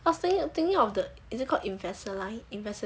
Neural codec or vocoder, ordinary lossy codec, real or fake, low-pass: none; none; real; none